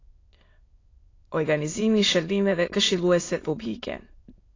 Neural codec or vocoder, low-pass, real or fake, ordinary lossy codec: autoencoder, 22.05 kHz, a latent of 192 numbers a frame, VITS, trained on many speakers; 7.2 kHz; fake; AAC, 32 kbps